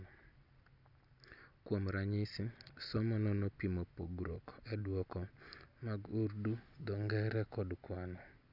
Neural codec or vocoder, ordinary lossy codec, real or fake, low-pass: none; none; real; 5.4 kHz